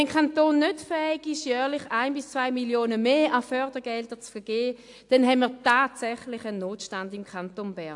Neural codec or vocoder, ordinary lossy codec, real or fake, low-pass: none; AAC, 64 kbps; real; 10.8 kHz